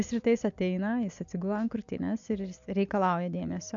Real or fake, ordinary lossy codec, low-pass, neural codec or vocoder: real; MP3, 64 kbps; 7.2 kHz; none